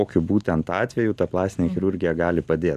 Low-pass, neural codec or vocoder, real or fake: 14.4 kHz; none; real